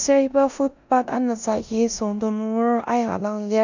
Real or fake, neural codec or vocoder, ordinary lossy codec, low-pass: fake; codec, 16 kHz in and 24 kHz out, 0.9 kbps, LongCat-Audio-Codec, four codebook decoder; none; 7.2 kHz